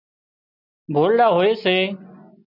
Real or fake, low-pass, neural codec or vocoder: real; 5.4 kHz; none